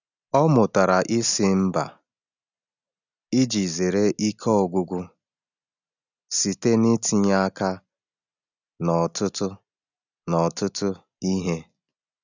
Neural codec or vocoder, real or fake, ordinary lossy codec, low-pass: none; real; none; 7.2 kHz